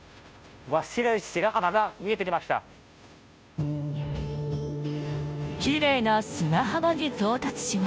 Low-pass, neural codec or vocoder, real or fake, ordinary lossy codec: none; codec, 16 kHz, 0.5 kbps, FunCodec, trained on Chinese and English, 25 frames a second; fake; none